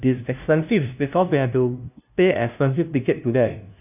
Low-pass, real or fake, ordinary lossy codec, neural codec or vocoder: 3.6 kHz; fake; none; codec, 16 kHz, 0.5 kbps, FunCodec, trained on LibriTTS, 25 frames a second